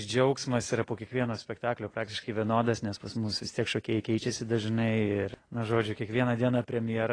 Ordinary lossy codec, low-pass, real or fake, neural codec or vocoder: AAC, 32 kbps; 9.9 kHz; real; none